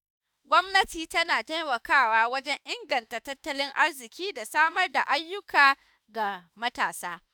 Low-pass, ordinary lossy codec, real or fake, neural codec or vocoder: none; none; fake; autoencoder, 48 kHz, 32 numbers a frame, DAC-VAE, trained on Japanese speech